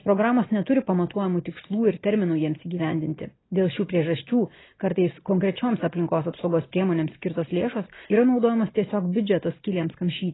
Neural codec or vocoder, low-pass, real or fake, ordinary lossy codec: none; 7.2 kHz; real; AAC, 16 kbps